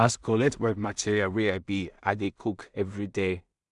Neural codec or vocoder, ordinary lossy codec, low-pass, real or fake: codec, 16 kHz in and 24 kHz out, 0.4 kbps, LongCat-Audio-Codec, two codebook decoder; none; 10.8 kHz; fake